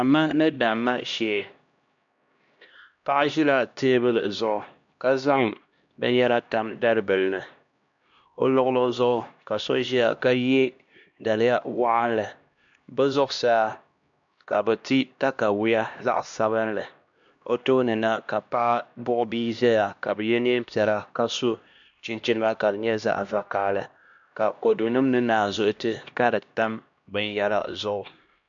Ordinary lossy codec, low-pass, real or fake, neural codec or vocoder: MP3, 64 kbps; 7.2 kHz; fake; codec, 16 kHz, 1 kbps, X-Codec, HuBERT features, trained on LibriSpeech